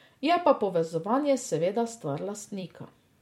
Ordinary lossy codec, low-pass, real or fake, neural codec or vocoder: MP3, 64 kbps; 19.8 kHz; fake; vocoder, 44.1 kHz, 128 mel bands every 256 samples, BigVGAN v2